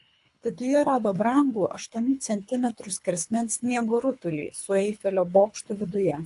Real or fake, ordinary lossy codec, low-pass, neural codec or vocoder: fake; AAC, 64 kbps; 10.8 kHz; codec, 24 kHz, 3 kbps, HILCodec